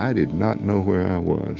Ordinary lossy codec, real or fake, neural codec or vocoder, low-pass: Opus, 32 kbps; fake; autoencoder, 48 kHz, 128 numbers a frame, DAC-VAE, trained on Japanese speech; 7.2 kHz